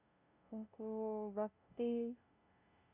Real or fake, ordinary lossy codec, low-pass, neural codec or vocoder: fake; none; 3.6 kHz; codec, 16 kHz, 0.5 kbps, FunCodec, trained on LibriTTS, 25 frames a second